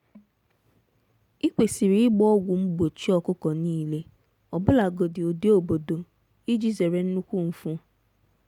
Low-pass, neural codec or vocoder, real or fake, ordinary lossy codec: 19.8 kHz; none; real; none